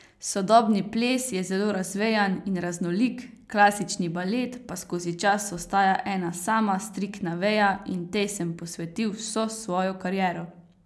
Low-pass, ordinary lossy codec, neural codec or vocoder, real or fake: none; none; none; real